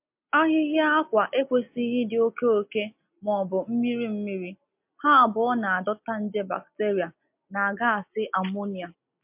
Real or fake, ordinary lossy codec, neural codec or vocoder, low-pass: real; MP3, 32 kbps; none; 3.6 kHz